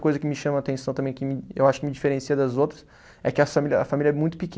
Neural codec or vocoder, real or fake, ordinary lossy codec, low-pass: none; real; none; none